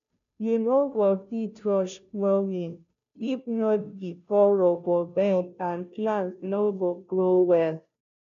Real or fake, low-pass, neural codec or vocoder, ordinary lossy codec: fake; 7.2 kHz; codec, 16 kHz, 0.5 kbps, FunCodec, trained on Chinese and English, 25 frames a second; none